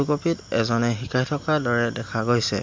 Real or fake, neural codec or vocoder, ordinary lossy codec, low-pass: real; none; MP3, 48 kbps; 7.2 kHz